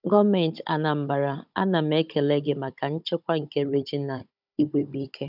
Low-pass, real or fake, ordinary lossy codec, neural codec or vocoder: 5.4 kHz; fake; none; codec, 16 kHz, 16 kbps, FunCodec, trained on Chinese and English, 50 frames a second